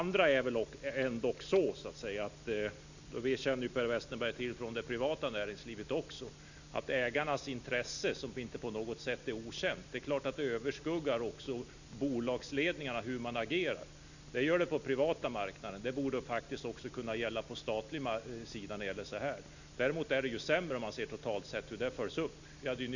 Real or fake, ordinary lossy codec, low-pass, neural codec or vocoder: real; none; 7.2 kHz; none